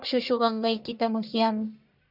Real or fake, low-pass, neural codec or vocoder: fake; 5.4 kHz; codec, 44.1 kHz, 1.7 kbps, Pupu-Codec